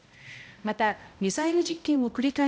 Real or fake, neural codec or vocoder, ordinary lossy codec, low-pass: fake; codec, 16 kHz, 0.5 kbps, X-Codec, HuBERT features, trained on balanced general audio; none; none